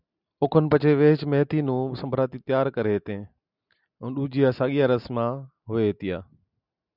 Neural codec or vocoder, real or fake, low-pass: none; real; 5.4 kHz